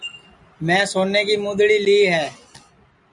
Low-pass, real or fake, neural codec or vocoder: 10.8 kHz; real; none